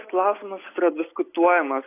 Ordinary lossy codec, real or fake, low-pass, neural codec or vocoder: AAC, 24 kbps; real; 3.6 kHz; none